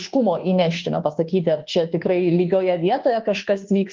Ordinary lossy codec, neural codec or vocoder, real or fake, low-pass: Opus, 16 kbps; codec, 24 kHz, 1.2 kbps, DualCodec; fake; 7.2 kHz